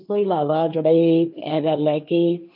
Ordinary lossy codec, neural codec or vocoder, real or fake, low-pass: none; codec, 16 kHz, 1.1 kbps, Voila-Tokenizer; fake; 5.4 kHz